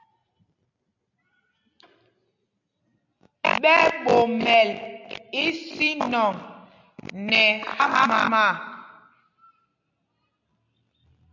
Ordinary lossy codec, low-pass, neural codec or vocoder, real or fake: AAC, 48 kbps; 7.2 kHz; none; real